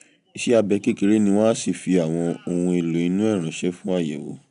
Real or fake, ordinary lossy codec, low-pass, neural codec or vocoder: real; none; 10.8 kHz; none